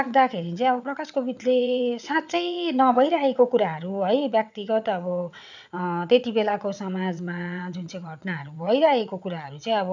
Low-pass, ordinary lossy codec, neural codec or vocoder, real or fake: 7.2 kHz; none; vocoder, 22.05 kHz, 80 mel bands, WaveNeXt; fake